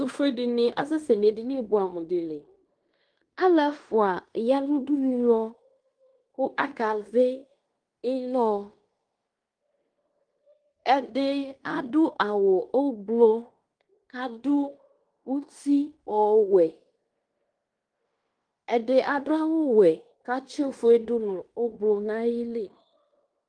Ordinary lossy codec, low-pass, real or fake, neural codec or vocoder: Opus, 32 kbps; 9.9 kHz; fake; codec, 16 kHz in and 24 kHz out, 0.9 kbps, LongCat-Audio-Codec, fine tuned four codebook decoder